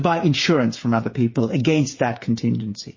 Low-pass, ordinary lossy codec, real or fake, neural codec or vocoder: 7.2 kHz; MP3, 32 kbps; fake; codec, 16 kHz, 8 kbps, FreqCodec, smaller model